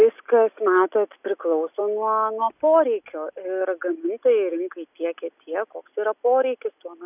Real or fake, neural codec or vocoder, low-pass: real; none; 3.6 kHz